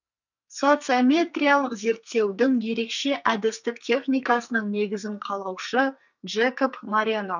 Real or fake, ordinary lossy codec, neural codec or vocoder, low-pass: fake; none; codec, 44.1 kHz, 2.6 kbps, SNAC; 7.2 kHz